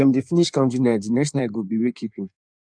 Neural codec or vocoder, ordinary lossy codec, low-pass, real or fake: codec, 16 kHz in and 24 kHz out, 1.1 kbps, FireRedTTS-2 codec; none; 9.9 kHz; fake